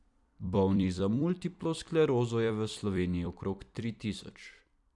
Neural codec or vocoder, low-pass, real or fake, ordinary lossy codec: vocoder, 44.1 kHz, 128 mel bands every 256 samples, BigVGAN v2; 10.8 kHz; fake; none